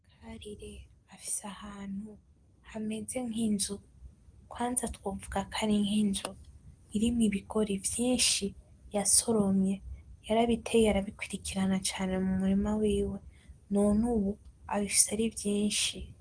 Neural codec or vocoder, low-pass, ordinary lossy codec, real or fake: none; 9.9 kHz; Opus, 24 kbps; real